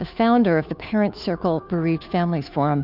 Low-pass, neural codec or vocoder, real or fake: 5.4 kHz; codec, 16 kHz, 2 kbps, FunCodec, trained on Chinese and English, 25 frames a second; fake